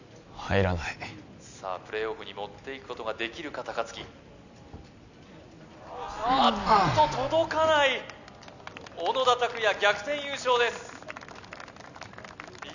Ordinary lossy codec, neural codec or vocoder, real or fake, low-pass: none; none; real; 7.2 kHz